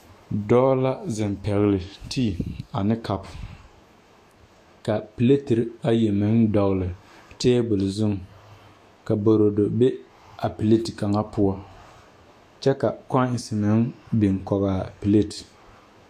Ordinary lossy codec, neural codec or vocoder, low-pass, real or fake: AAC, 64 kbps; autoencoder, 48 kHz, 128 numbers a frame, DAC-VAE, trained on Japanese speech; 14.4 kHz; fake